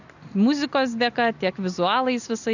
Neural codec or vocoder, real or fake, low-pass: none; real; 7.2 kHz